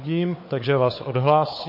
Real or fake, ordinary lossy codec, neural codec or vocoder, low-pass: fake; MP3, 32 kbps; codec, 16 kHz, 4 kbps, FunCodec, trained on Chinese and English, 50 frames a second; 5.4 kHz